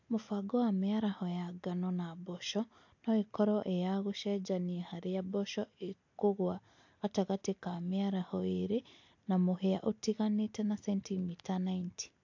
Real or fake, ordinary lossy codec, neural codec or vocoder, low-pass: real; AAC, 48 kbps; none; 7.2 kHz